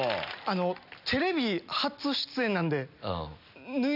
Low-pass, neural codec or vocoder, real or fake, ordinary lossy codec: 5.4 kHz; none; real; none